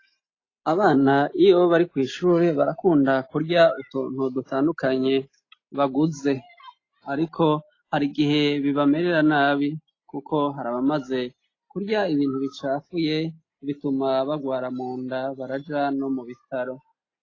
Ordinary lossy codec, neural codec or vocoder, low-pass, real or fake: AAC, 32 kbps; none; 7.2 kHz; real